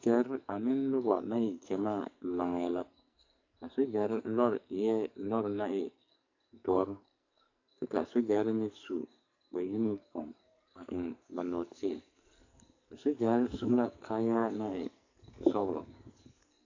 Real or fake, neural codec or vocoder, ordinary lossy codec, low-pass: fake; codec, 44.1 kHz, 2.6 kbps, SNAC; AAC, 48 kbps; 7.2 kHz